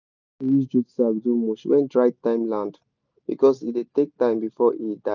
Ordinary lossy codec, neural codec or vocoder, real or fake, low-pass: none; none; real; 7.2 kHz